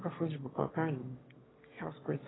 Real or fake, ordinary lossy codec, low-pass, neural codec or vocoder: fake; AAC, 16 kbps; 7.2 kHz; autoencoder, 22.05 kHz, a latent of 192 numbers a frame, VITS, trained on one speaker